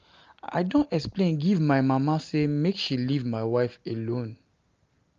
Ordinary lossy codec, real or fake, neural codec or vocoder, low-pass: Opus, 32 kbps; real; none; 7.2 kHz